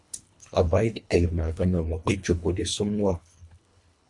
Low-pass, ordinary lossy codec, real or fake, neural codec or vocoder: 10.8 kHz; MP3, 64 kbps; fake; codec, 24 kHz, 1.5 kbps, HILCodec